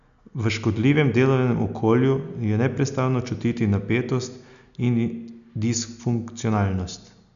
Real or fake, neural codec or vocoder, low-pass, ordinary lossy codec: real; none; 7.2 kHz; none